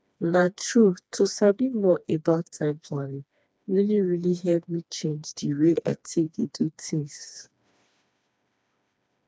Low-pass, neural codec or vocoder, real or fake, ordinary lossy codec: none; codec, 16 kHz, 2 kbps, FreqCodec, smaller model; fake; none